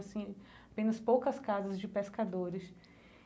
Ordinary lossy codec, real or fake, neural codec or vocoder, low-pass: none; real; none; none